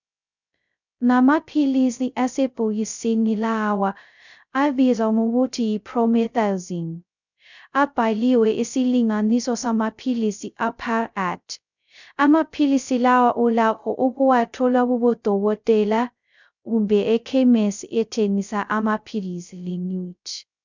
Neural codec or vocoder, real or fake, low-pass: codec, 16 kHz, 0.2 kbps, FocalCodec; fake; 7.2 kHz